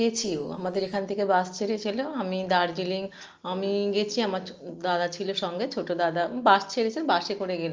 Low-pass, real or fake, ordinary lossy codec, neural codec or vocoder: 7.2 kHz; real; Opus, 24 kbps; none